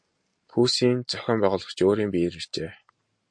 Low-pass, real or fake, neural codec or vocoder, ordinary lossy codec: 9.9 kHz; real; none; MP3, 48 kbps